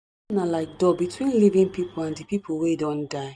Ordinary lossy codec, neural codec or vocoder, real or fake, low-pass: none; none; real; none